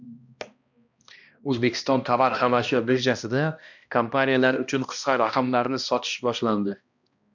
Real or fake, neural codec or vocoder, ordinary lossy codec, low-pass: fake; codec, 16 kHz, 1 kbps, X-Codec, HuBERT features, trained on balanced general audio; MP3, 64 kbps; 7.2 kHz